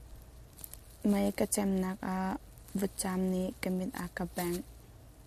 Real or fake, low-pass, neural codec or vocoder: real; 14.4 kHz; none